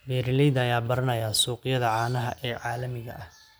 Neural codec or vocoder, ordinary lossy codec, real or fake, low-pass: none; none; real; none